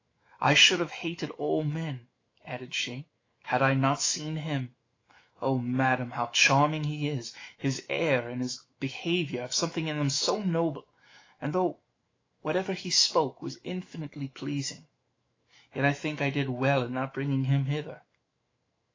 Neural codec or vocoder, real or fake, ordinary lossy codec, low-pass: autoencoder, 48 kHz, 128 numbers a frame, DAC-VAE, trained on Japanese speech; fake; AAC, 32 kbps; 7.2 kHz